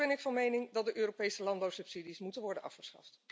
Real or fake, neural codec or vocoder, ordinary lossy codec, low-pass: real; none; none; none